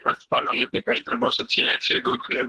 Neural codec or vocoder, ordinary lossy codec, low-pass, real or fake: codec, 24 kHz, 1.5 kbps, HILCodec; Opus, 16 kbps; 10.8 kHz; fake